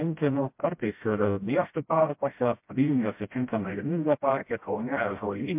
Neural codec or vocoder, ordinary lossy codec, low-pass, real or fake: codec, 16 kHz, 0.5 kbps, FreqCodec, smaller model; MP3, 32 kbps; 3.6 kHz; fake